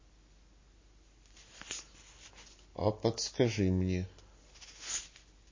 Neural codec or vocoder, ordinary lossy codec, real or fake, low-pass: none; MP3, 32 kbps; real; 7.2 kHz